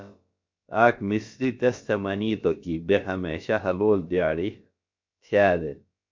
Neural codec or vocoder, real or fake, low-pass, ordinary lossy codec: codec, 16 kHz, about 1 kbps, DyCAST, with the encoder's durations; fake; 7.2 kHz; MP3, 48 kbps